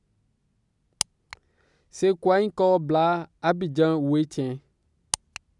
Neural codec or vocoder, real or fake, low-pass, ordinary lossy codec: none; real; 10.8 kHz; none